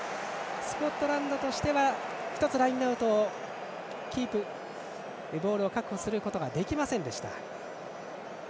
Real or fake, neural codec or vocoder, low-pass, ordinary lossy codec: real; none; none; none